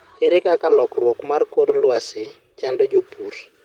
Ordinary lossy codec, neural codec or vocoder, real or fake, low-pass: Opus, 24 kbps; vocoder, 44.1 kHz, 128 mel bands, Pupu-Vocoder; fake; 19.8 kHz